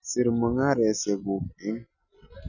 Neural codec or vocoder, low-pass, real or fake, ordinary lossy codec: none; 7.2 kHz; real; none